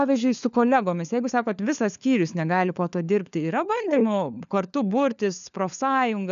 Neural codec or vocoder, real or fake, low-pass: codec, 16 kHz, 4 kbps, FunCodec, trained on LibriTTS, 50 frames a second; fake; 7.2 kHz